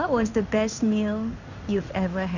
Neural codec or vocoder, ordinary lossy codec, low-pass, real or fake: codec, 16 kHz in and 24 kHz out, 1 kbps, XY-Tokenizer; none; 7.2 kHz; fake